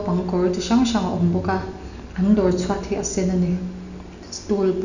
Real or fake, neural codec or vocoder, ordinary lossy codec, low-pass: real; none; none; 7.2 kHz